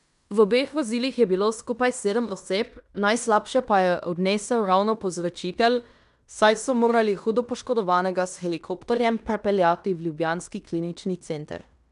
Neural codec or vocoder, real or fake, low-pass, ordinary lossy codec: codec, 16 kHz in and 24 kHz out, 0.9 kbps, LongCat-Audio-Codec, fine tuned four codebook decoder; fake; 10.8 kHz; none